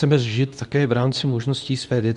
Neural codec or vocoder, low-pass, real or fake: codec, 24 kHz, 0.9 kbps, WavTokenizer, medium speech release version 2; 10.8 kHz; fake